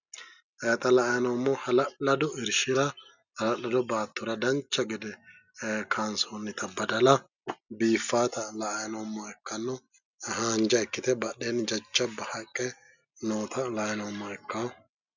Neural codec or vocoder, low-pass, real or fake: none; 7.2 kHz; real